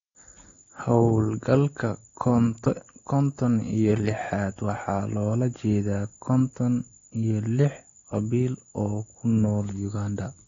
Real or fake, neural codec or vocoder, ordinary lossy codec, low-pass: real; none; AAC, 32 kbps; 7.2 kHz